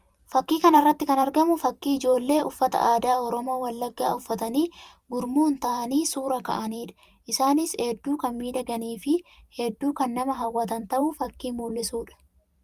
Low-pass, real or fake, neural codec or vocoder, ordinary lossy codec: 14.4 kHz; fake; vocoder, 44.1 kHz, 128 mel bands every 512 samples, BigVGAN v2; Opus, 32 kbps